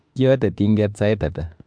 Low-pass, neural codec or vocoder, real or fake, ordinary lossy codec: 9.9 kHz; autoencoder, 48 kHz, 32 numbers a frame, DAC-VAE, trained on Japanese speech; fake; MP3, 64 kbps